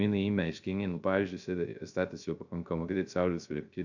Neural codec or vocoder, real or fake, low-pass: codec, 16 kHz, 0.3 kbps, FocalCodec; fake; 7.2 kHz